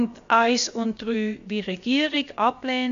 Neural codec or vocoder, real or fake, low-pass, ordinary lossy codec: codec, 16 kHz, about 1 kbps, DyCAST, with the encoder's durations; fake; 7.2 kHz; AAC, 64 kbps